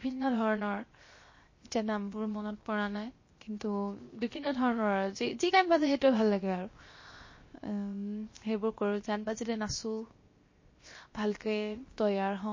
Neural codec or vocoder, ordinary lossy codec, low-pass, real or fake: codec, 16 kHz, 0.7 kbps, FocalCodec; MP3, 32 kbps; 7.2 kHz; fake